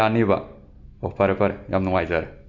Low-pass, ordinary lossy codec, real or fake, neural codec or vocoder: 7.2 kHz; none; real; none